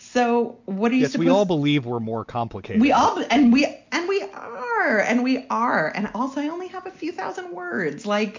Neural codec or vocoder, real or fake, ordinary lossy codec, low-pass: none; real; MP3, 48 kbps; 7.2 kHz